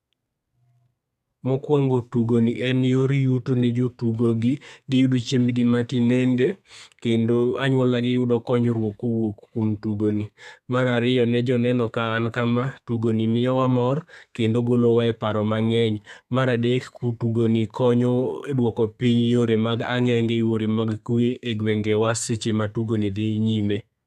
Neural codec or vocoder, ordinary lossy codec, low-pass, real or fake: codec, 32 kHz, 1.9 kbps, SNAC; none; 14.4 kHz; fake